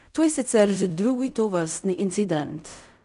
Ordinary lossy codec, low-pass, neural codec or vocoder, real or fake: none; 10.8 kHz; codec, 16 kHz in and 24 kHz out, 0.4 kbps, LongCat-Audio-Codec, fine tuned four codebook decoder; fake